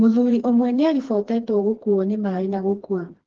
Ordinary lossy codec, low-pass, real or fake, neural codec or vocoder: Opus, 16 kbps; 7.2 kHz; fake; codec, 16 kHz, 2 kbps, FreqCodec, smaller model